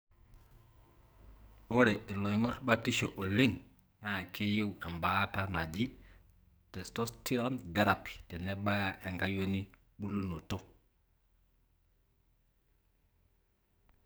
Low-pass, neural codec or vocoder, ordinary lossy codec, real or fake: none; codec, 44.1 kHz, 2.6 kbps, SNAC; none; fake